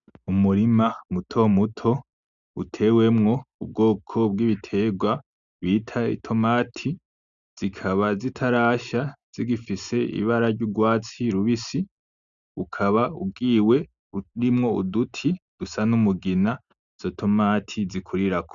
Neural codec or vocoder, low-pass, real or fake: none; 7.2 kHz; real